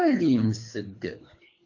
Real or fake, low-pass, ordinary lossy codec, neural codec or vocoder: fake; 7.2 kHz; AAC, 48 kbps; codec, 24 kHz, 3 kbps, HILCodec